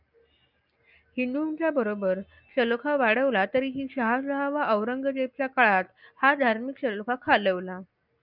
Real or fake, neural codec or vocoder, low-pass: real; none; 5.4 kHz